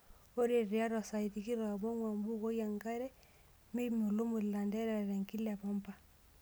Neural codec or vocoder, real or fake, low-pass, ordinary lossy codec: none; real; none; none